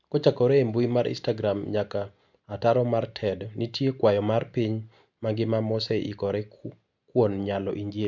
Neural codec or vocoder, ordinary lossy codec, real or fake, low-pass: none; MP3, 64 kbps; real; 7.2 kHz